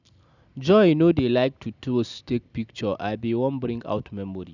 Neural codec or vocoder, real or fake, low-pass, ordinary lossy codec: none; real; 7.2 kHz; none